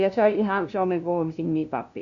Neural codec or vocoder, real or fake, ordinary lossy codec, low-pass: codec, 16 kHz, 0.5 kbps, FunCodec, trained on LibriTTS, 25 frames a second; fake; none; 7.2 kHz